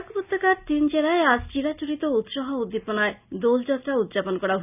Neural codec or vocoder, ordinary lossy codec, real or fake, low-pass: none; none; real; 3.6 kHz